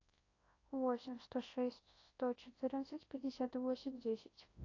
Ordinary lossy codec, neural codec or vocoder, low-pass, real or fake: AAC, 32 kbps; codec, 24 kHz, 0.9 kbps, WavTokenizer, large speech release; 7.2 kHz; fake